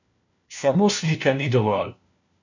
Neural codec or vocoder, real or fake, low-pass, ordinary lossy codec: codec, 16 kHz, 1 kbps, FunCodec, trained on LibriTTS, 50 frames a second; fake; 7.2 kHz; none